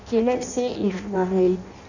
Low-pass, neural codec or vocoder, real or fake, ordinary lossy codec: 7.2 kHz; codec, 16 kHz in and 24 kHz out, 0.6 kbps, FireRedTTS-2 codec; fake; none